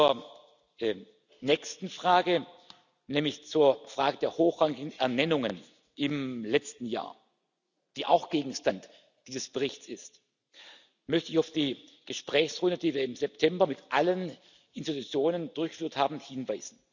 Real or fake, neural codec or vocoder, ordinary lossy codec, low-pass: real; none; none; 7.2 kHz